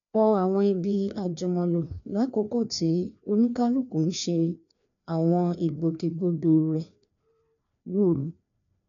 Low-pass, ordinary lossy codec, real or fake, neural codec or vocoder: 7.2 kHz; none; fake; codec, 16 kHz, 2 kbps, FreqCodec, larger model